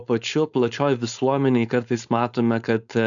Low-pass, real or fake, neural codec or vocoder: 7.2 kHz; fake; codec, 16 kHz, 4.8 kbps, FACodec